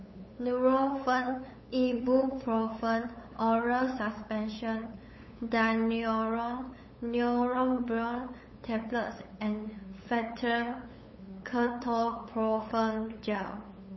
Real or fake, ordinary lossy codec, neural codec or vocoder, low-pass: fake; MP3, 24 kbps; codec, 16 kHz, 8 kbps, FunCodec, trained on LibriTTS, 25 frames a second; 7.2 kHz